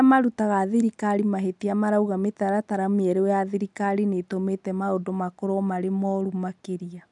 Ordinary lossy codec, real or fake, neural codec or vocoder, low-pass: none; real; none; 10.8 kHz